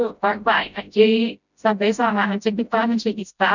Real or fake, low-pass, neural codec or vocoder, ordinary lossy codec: fake; 7.2 kHz; codec, 16 kHz, 0.5 kbps, FreqCodec, smaller model; none